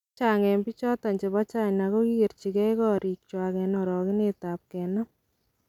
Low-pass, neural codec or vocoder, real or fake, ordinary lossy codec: 19.8 kHz; none; real; none